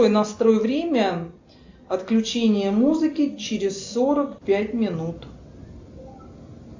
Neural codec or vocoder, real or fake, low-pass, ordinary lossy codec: none; real; 7.2 kHz; AAC, 48 kbps